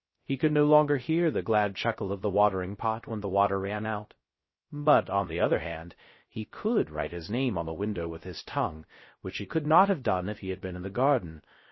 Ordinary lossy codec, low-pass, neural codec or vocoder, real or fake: MP3, 24 kbps; 7.2 kHz; codec, 16 kHz, 0.3 kbps, FocalCodec; fake